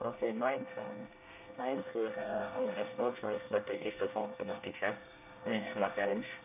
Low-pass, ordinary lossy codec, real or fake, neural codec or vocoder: 3.6 kHz; none; fake; codec, 24 kHz, 1 kbps, SNAC